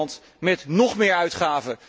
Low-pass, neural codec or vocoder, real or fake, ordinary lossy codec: none; none; real; none